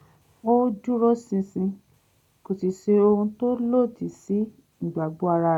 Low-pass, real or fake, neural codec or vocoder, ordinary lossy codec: 19.8 kHz; real; none; none